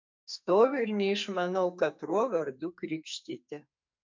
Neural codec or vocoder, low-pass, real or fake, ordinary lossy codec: codec, 44.1 kHz, 2.6 kbps, SNAC; 7.2 kHz; fake; MP3, 48 kbps